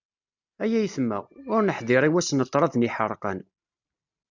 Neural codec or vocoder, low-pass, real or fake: none; 7.2 kHz; real